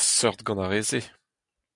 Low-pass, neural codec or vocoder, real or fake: 10.8 kHz; none; real